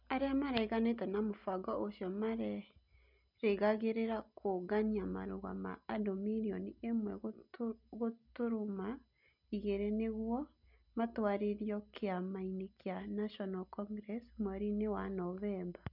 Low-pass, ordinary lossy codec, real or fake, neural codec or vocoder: 5.4 kHz; none; real; none